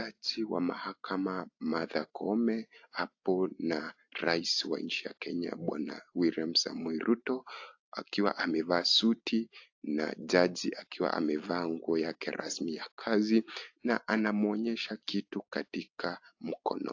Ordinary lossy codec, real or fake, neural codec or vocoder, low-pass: AAC, 48 kbps; real; none; 7.2 kHz